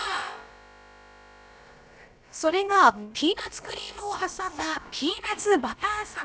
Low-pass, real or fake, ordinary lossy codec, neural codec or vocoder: none; fake; none; codec, 16 kHz, about 1 kbps, DyCAST, with the encoder's durations